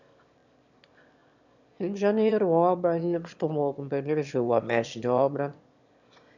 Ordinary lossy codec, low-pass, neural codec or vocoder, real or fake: none; 7.2 kHz; autoencoder, 22.05 kHz, a latent of 192 numbers a frame, VITS, trained on one speaker; fake